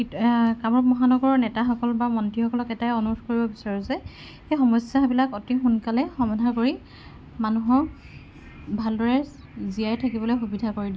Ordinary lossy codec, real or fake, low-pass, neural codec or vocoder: none; real; none; none